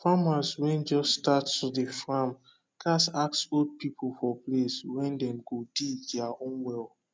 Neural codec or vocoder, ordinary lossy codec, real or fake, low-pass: none; none; real; none